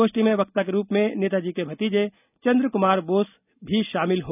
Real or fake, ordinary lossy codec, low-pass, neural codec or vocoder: real; none; 3.6 kHz; none